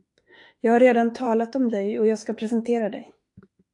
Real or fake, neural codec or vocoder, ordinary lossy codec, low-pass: fake; autoencoder, 48 kHz, 32 numbers a frame, DAC-VAE, trained on Japanese speech; AAC, 48 kbps; 10.8 kHz